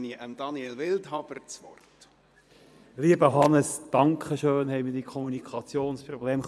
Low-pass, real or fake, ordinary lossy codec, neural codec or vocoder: none; fake; none; vocoder, 24 kHz, 100 mel bands, Vocos